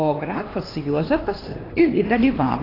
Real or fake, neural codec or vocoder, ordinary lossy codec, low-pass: fake; codec, 16 kHz, 2 kbps, X-Codec, HuBERT features, trained on LibriSpeech; AAC, 24 kbps; 5.4 kHz